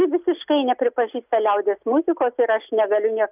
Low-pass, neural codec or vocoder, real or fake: 3.6 kHz; none; real